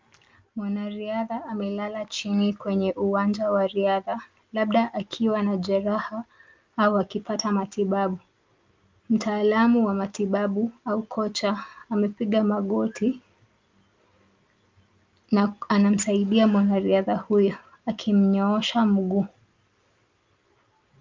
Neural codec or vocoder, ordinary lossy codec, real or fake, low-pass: none; Opus, 24 kbps; real; 7.2 kHz